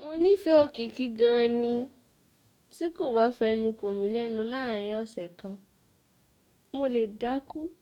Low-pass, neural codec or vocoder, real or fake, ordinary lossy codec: 19.8 kHz; codec, 44.1 kHz, 2.6 kbps, DAC; fake; none